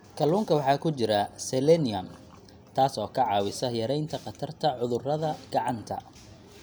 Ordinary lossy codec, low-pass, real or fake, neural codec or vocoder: none; none; real; none